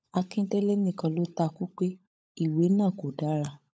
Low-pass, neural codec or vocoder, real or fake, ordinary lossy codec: none; codec, 16 kHz, 16 kbps, FunCodec, trained on LibriTTS, 50 frames a second; fake; none